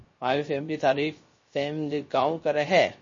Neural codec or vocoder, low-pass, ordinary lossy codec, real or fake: codec, 16 kHz, 0.3 kbps, FocalCodec; 7.2 kHz; MP3, 32 kbps; fake